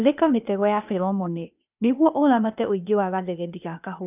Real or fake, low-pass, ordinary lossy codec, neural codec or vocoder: fake; 3.6 kHz; none; codec, 16 kHz, 0.8 kbps, ZipCodec